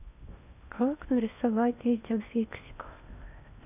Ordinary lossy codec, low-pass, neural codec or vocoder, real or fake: none; 3.6 kHz; codec, 16 kHz in and 24 kHz out, 0.8 kbps, FocalCodec, streaming, 65536 codes; fake